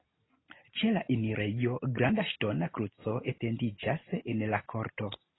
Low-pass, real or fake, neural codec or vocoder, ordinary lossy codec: 7.2 kHz; real; none; AAC, 16 kbps